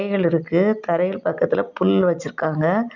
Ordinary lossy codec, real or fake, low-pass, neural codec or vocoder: none; real; 7.2 kHz; none